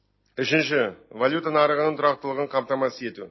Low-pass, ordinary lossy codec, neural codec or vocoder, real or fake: 7.2 kHz; MP3, 24 kbps; none; real